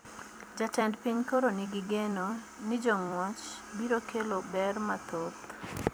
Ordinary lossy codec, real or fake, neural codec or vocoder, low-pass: none; fake; vocoder, 44.1 kHz, 128 mel bands every 256 samples, BigVGAN v2; none